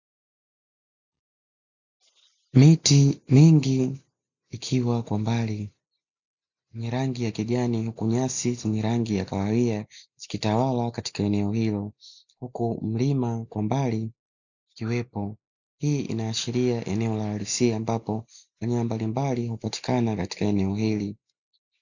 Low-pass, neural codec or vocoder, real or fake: 7.2 kHz; none; real